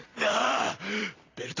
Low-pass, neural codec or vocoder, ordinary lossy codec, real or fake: 7.2 kHz; vocoder, 44.1 kHz, 128 mel bands every 256 samples, BigVGAN v2; AAC, 32 kbps; fake